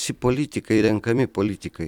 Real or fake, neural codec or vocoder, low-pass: fake; vocoder, 44.1 kHz, 128 mel bands every 256 samples, BigVGAN v2; 19.8 kHz